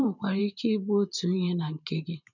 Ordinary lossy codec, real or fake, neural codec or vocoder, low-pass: none; fake; vocoder, 44.1 kHz, 128 mel bands every 512 samples, BigVGAN v2; 7.2 kHz